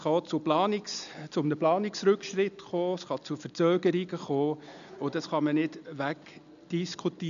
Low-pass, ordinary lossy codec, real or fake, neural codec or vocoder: 7.2 kHz; none; real; none